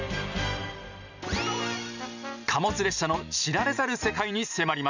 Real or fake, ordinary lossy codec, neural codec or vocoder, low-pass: real; none; none; 7.2 kHz